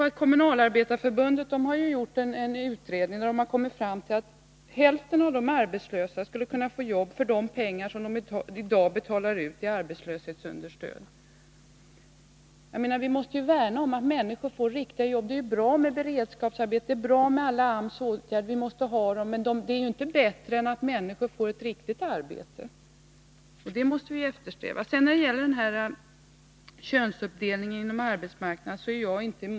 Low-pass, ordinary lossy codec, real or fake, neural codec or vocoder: none; none; real; none